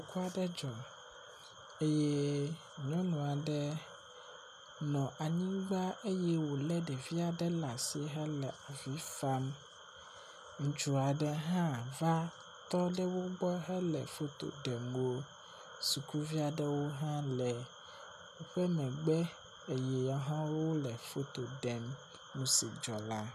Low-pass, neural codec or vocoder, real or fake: 14.4 kHz; none; real